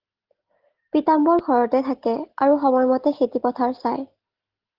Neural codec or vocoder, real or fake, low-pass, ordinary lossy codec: none; real; 5.4 kHz; Opus, 16 kbps